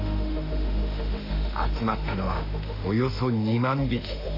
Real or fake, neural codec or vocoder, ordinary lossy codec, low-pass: fake; autoencoder, 48 kHz, 32 numbers a frame, DAC-VAE, trained on Japanese speech; AAC, 32 kbps; 5.4 kHz